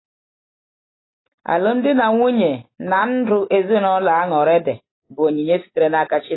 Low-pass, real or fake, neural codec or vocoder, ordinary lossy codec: 7.2 kHz; real; none; AAC, 16 kbps